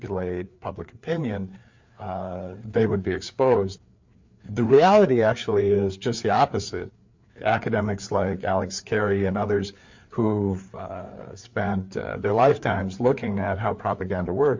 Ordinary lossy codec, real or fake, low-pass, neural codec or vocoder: MP3, 48 kbps; fake; 7.2 kHz; codec, 16 kHz, 4 kbps, FreqCodec, larger model